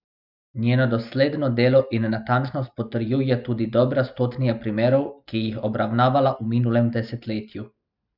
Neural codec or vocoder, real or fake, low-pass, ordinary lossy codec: none; real; 5.4 kHz; none